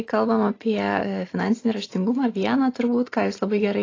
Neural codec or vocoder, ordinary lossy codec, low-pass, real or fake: none; AAC, 32 kbps; 7.2 kHz; real